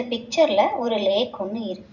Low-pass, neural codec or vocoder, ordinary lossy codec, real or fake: 7.2 kHz; none; none; real